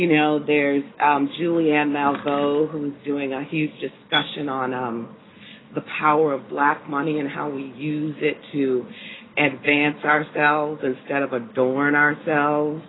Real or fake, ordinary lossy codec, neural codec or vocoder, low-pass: real; AAC, 16 kbps; none; 7.2 kHz